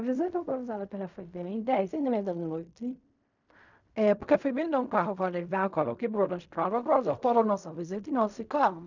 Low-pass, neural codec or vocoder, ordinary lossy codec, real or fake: 7.2 kHz; codec, 16 kHz in and 24 kHz out, 0.4 kbps, LongCat-Audio-Codec, fine tuned four codebook decoder; none; fake